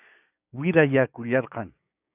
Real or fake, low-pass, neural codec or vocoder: fake; 3.6 kHz; codec, 16 kHz, 6 kbps, DAC